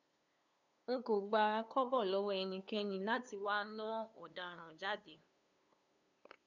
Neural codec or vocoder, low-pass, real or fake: codec, 16 kHz, 2 kbps, FunCodec, trained on LibriTTS, 25 frames a second; 7.2 kHz; fake